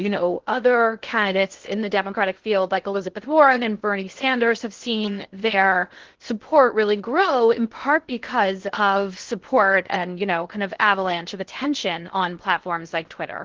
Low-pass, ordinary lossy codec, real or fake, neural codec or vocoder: 7.2 kHz; Opus, 16 kbps; fake; codec, 16 kHz in and 24 kHz out, 0.6 kbps, FocalCodec, streaming, 2048 codes